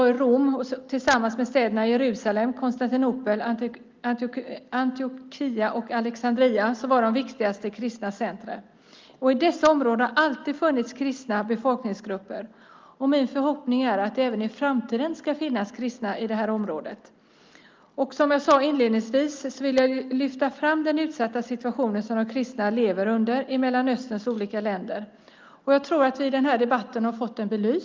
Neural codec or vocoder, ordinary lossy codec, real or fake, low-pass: none; Opus, 24 kbps; real; 7.2 kHz